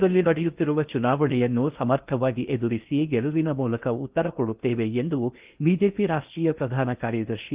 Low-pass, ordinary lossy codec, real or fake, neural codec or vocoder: 3.6 kHz; Opus, 24 kbps; fake; codec, 16 kHz in and 24 kHz out, 0.6 kbps, FocalCodec, streaming, 4096 codes